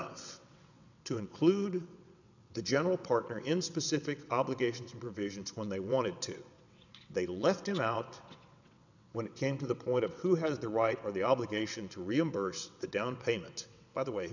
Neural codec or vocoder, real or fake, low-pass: vocoder, 22.05 kHz, 80 mel bands, WaveNeXt; fake; 7.2 kHz